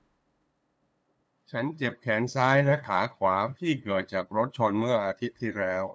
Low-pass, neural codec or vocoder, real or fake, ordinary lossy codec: none; codec, 16 kHz, 2 kbps, FunCodec, trained on LibriTTS, 25 frames a second; fake; none